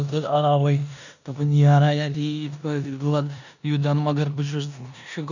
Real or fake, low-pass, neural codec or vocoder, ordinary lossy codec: fake; 7.2 kHz; codec, 16 kHz in and 24 kHz out, 0.9 kbps, LongCat-Audio-Codec, four codebook decoder; none